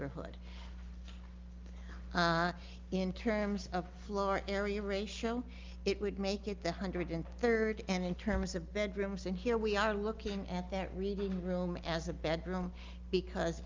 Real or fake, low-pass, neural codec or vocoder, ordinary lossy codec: real; 7.2 kHz; none; Opus, 24 kbps